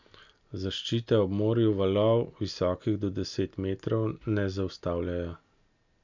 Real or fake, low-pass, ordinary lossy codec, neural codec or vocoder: real; 7.2 kHz; none; none